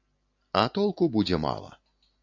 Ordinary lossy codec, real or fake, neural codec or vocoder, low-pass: AAC, 48 kbps; real; none; 7.2 kHz